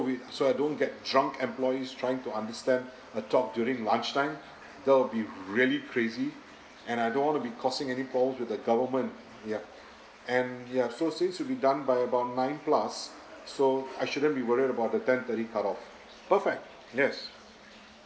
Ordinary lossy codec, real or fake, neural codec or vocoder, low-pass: none; real; none; none